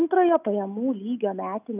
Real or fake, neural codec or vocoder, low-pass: real; none; 3.6 kHz